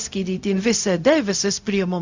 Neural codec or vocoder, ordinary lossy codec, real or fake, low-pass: codec, 16 kHz, 0.4 kbps, LongCat-Audio-Codec; Opus, 64 kbps; fake; 7.2 kHz